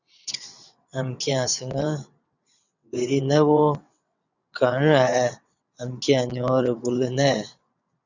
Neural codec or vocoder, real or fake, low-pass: vocoder, 22.05 kHz, 80 mel bands, WaveNeXt; fake; 7.2 kHz